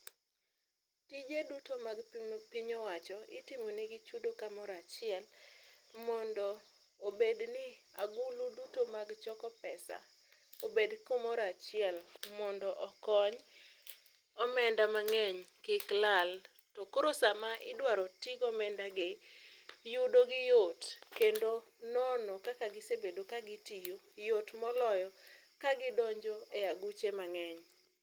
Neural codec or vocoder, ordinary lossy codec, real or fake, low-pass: none; Opus, 24 kbps; real; 19.8 kHz